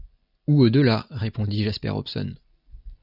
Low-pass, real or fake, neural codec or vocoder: 5.4 kHz; real; none